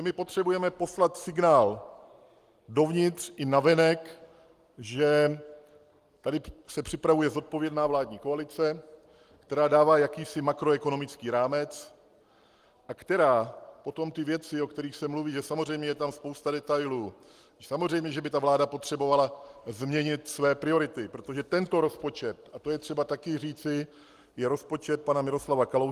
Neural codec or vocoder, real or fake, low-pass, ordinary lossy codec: none; real; 14.4 kHz; Opus, 24 kbps